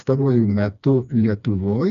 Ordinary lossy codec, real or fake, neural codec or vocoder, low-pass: MP3, 96 kbps; fake; codec, 16 kHz, 2 kbps, FreqCodec, smaller model; 7.2 kHz